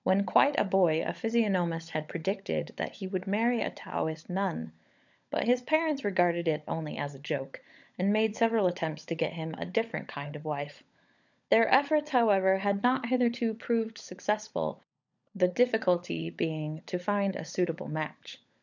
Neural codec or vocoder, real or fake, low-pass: codec, 16 kHz, 16 kbps, FunCodec, trained on LibriTTS, 50 frames a second; fake; 7.2 kHz